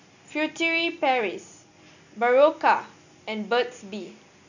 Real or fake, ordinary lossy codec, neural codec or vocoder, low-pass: real; none; none; 7.2 kHz